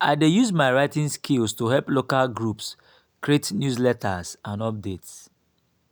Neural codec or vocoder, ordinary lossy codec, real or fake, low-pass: none; none; real; none